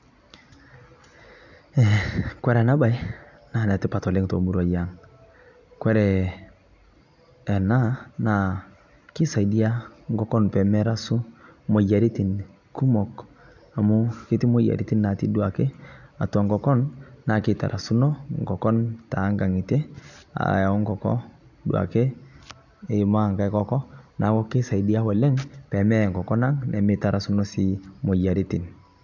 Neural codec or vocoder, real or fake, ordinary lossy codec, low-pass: none; real; none; 7.2 kHz